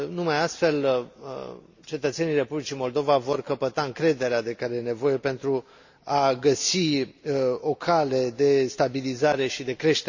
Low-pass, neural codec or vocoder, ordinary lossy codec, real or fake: 7.2 kHz; none; Opus, 64 kbps; real